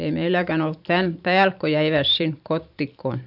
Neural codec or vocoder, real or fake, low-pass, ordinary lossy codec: vocoder, 44.1 kHz, 128 mel bands every 512 samples, BigVGAN v2; fake; 5.4 kHz; none